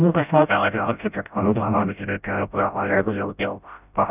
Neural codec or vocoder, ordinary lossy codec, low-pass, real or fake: codec, 16 kHz, 0.5 kbps, FreqCodec, smaller model; none; 3.6 kHz; fake